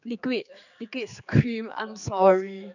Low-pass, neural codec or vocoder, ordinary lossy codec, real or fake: 7.2 kHz; codec, 16 kHz, 4 kbps, X-Codec, HuBERT features, trained on general audio; none; fake